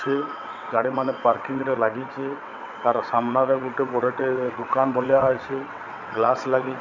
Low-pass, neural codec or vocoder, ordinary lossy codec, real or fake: 7.2 kHz; vocoder, 22.05 kHz, 80 mel bands, WaveNeXt; none; fake